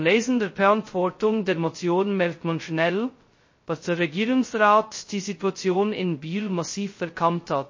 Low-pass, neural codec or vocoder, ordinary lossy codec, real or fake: 7.2 kHz; codec, 16 kHz, 0.2 kbps, FocalCodec; MP3, 32 kbps; fake